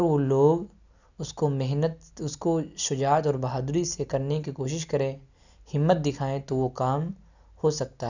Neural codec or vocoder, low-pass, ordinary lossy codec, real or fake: none; 7.2 kHz; none; real